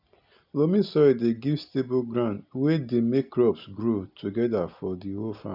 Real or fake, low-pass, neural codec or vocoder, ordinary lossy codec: real; 5.4 kHz; none; none